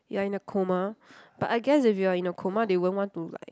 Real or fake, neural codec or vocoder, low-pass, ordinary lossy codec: real; none; none; none